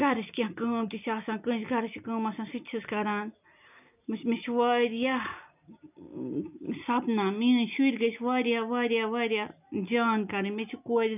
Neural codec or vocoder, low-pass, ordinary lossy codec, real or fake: none; 3.6 kHz; none; real